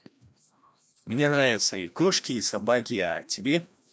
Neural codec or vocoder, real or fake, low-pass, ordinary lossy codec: codec, 16 kHz, 1 kbps, FreqCodec, larger model; fake; none; none